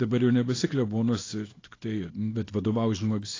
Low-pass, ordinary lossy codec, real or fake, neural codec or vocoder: 7.2 kHz; AAC, 32 kbps; fake; codec, 24 kHz, 0.9 kbps, WavTokenizer, small release